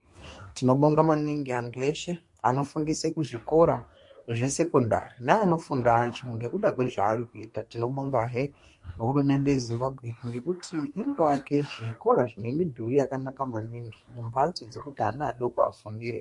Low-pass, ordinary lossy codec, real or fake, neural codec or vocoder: 10.8 kHz; MP3, 48 kbps; fake; codec, 24 kHz, 1 kbps, SNAC